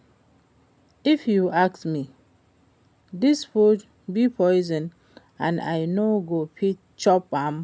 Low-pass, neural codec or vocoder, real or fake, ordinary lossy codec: none; none; real; none